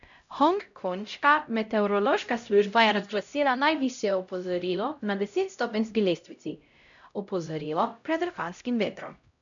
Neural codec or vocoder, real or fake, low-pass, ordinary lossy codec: codec, 16 kHz, 0.5 kbps, X-Codec, HuBERT features, trained on LibriSpeech; fake; 7.2 kHz; none